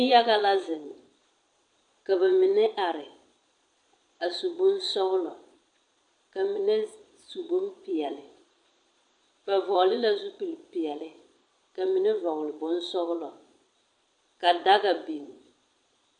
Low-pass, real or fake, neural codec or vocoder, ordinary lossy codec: 9.9 kHz; fake; vocoder, 22.05 kHz, 80 mel bands, Vocos; AAC, 64 kbps